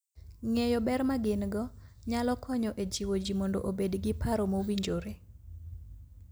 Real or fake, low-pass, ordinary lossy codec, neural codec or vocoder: real; none; none; none